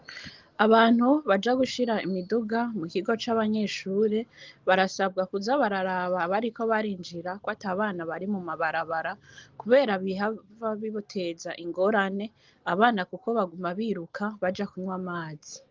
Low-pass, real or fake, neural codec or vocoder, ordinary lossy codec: 7.2 kHz; real; none; Opus, 32 kbps